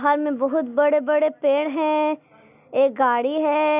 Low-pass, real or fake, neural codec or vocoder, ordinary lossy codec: 3.6 kHz; real; none; none